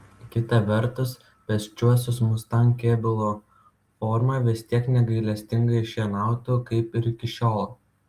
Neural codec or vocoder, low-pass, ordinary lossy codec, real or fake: none; 14.4 kHz; Opus, 32 kbps; real